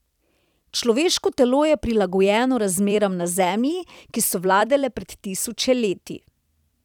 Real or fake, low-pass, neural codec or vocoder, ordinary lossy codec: fake; 19.8 kHz; vocoder, 44.1 kHz, 128 mel bands every 256 samples, BigVGAN v2; none